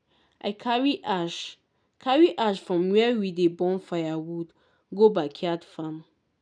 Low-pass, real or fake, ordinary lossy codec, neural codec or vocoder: 9.9 kHz; real; none; none